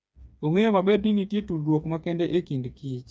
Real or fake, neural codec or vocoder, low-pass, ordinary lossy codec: fake; codec, 16 kHz, 4 kbps, FreqCodec, smaller model; none; none